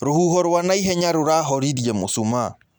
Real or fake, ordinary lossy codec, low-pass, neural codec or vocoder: real; none; none; none